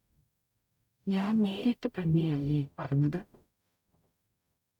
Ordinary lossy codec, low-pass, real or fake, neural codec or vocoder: none; 19.8 kHz; fake; codec, 44.1 kHz, 0.9 kbps, DAC